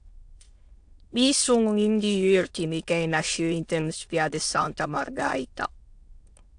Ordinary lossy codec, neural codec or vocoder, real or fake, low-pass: AAC, 64 kbps; autoencoder, 22.05 kHz, a latent of 192 numbers a frame, VITS, trained on many speakers; fake; 9.9 kHz